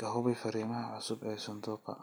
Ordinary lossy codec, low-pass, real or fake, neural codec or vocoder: none; none; real; none